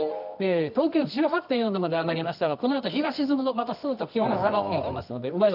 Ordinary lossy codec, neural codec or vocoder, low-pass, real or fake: none; codec, 24 kHz, 0.9 kbps, WavTokenizer, medium music audio release; 5.4 kHz; fake